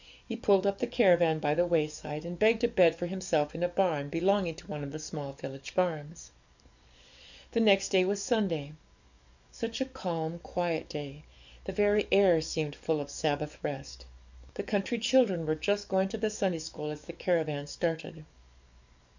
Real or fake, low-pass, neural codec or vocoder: fake; 7.2 kHz; codec, 44.1 kHz, 7.8 kbps, DAC